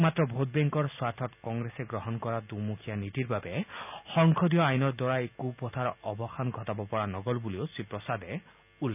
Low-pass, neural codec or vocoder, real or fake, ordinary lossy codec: 3.6 kHz; none; real; none